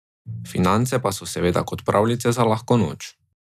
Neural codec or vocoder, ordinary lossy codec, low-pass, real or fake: none; none; 14.4 kHz; real